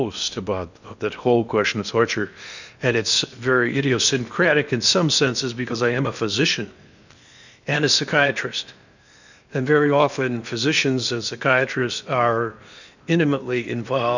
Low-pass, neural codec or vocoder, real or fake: 7.2 kHz; codec, 16 kHz in and 24 kHz out, 0.8 kbps, FocalCodec, streaming, 65536 codes; fake